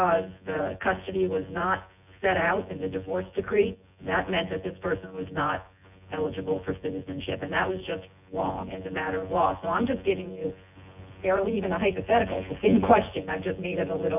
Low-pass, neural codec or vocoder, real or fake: 3.6 kHz; vocoder, 24 kHz, 100 mel bands, Vocos; fake